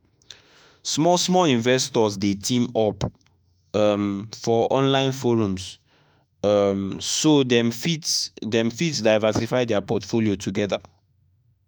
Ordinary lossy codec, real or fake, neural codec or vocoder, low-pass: none; fake; autoencoder, 48 kHz, 32 numbers a frame, DAC-VAE, trained on Japanese speech; none